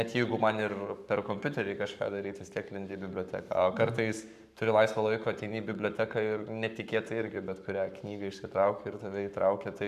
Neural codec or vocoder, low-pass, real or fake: codec, 44.1 kHz, 7.8 kbps, Pupu-Codec; 14.4 kHz; fake